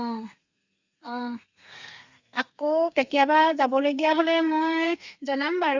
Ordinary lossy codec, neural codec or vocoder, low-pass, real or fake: Opus, 64 kbps; codec, 32 kHz, 1.9 kbps, SNAC; 7.2 kHz; fake